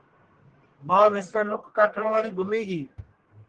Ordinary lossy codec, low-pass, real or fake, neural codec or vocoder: Opus, 16 kbps; 10.8 kHz; fake; codec, 44.1 kHz, 1.7 kbps, Pupu-Codec